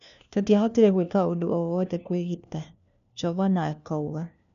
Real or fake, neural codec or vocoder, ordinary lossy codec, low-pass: fake; codec, 16 kHz, 1 kbps, FunCodec, trained on LibriTTS, 50 frames a second; none; 7.2 kHz